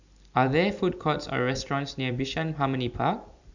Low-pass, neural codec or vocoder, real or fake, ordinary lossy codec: 7.2 kHz; none; real; none